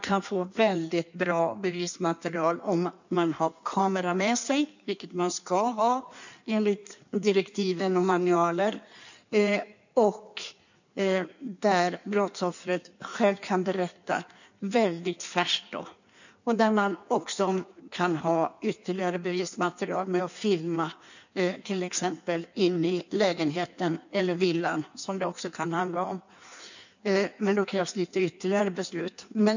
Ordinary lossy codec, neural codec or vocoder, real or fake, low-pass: none; codec, 16 kHz in and 24 kHz out, 1.1 kbps, FireRedTTS-2 codec; fake; 7.2 kHz